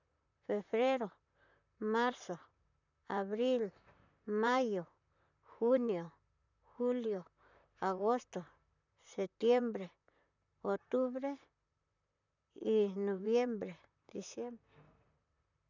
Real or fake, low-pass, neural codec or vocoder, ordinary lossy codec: fake; 7.2 kHz; vocoder, 44.1 kHz, 128 mel bands, Pupu-Vocoder; none